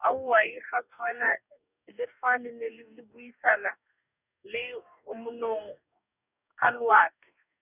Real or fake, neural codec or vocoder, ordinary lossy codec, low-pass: fake; codec, 44.1 kHz, 2.6 kbps, DAC; none; 3.6 kHz